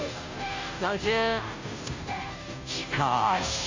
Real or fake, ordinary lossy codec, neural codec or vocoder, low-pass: fake; MP3, 64 kbps; codec, 16 kHz, 0.5 kbps, FunCodec, trained on Chinese and English, 25 frames a second; 7.2 kHz